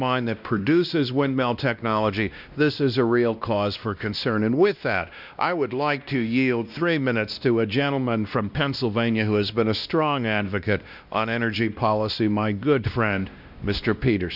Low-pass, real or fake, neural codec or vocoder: 5.4 kHz; fake; codec, 16 kHz, 1 kbps, X-Codec, WavLM features, trained on Multilingual LibriSpeech